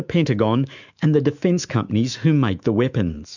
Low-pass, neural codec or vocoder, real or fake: 7.2 kHz; autoencoder, 48 kHz, 128 numbers a frame, DAC-VAE, trained on Japanese speech; fake